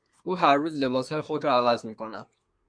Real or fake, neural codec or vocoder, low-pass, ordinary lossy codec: fake; codec, 24 kHz, 1 kbps, SNAC; 9.9 kHz; MP3, 64 kbps